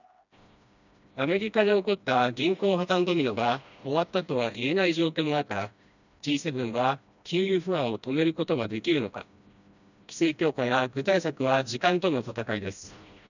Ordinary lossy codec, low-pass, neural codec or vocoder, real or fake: none; 7.2 kHz; codec, 16 kHz, 1 kbps, FreqCodec, smaller model; fake